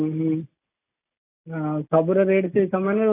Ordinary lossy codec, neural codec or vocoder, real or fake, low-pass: AAC, 24 kbps; none; real; 3.6 kHz